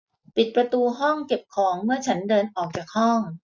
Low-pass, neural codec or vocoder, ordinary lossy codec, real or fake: none; none; none; real